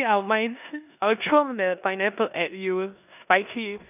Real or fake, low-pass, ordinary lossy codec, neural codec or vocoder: fake; 3.6 kHz; none; codec, 16 kHz in and 24 kHz out, 0.9 kbps, LongCat-Audio-Codec, four codebook decoder